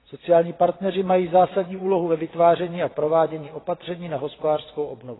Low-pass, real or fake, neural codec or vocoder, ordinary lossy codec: 7.2 kHz; fake; vocoder, 22.05 kHz, 80 mel bands, WaveNeXt; AAC, 16 kbps